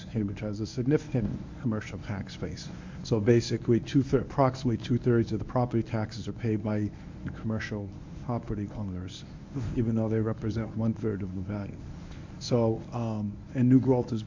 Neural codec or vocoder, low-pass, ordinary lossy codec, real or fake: codec, 24 kHz, 0.9 kbps, WavTokenizer, medium speech release version 1; 7.2 kHz; MP3, 48 kbps; fake